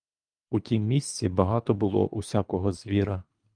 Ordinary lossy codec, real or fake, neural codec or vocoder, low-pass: Opus, 32 kbps; fake; codec, 24 kHz, 3 kbps, HILCodec; 9.9 kHz